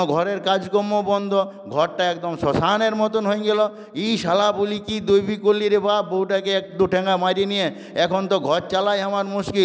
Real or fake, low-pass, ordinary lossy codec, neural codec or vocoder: real; none; none; none